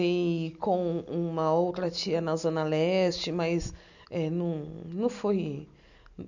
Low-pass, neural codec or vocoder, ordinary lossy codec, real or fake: 7.2 kHz; none; none; real